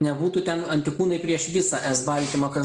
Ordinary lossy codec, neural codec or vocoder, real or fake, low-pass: Opus, 32 kbps; none; real; 10.8 kHz